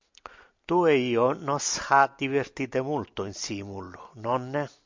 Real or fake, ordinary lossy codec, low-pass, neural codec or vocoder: real; MP3, 64 kbps; 7.2 kHz; none